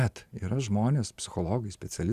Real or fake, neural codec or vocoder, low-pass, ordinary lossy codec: real; none; 14.4 kHz; Opus, 64 kbps